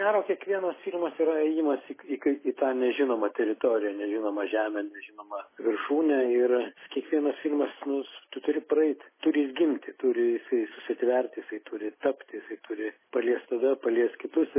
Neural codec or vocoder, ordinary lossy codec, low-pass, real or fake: none; MP3, 16 kbps; 3.6 kHz; real